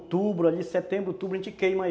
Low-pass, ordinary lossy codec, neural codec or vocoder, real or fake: none; none; none; real